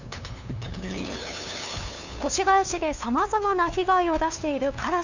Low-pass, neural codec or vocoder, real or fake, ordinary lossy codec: 7.2 kHz; codec, 16 kHz, 2 kbps, FunCodec, trained on LibriTTS, 25 frames a second; fake; none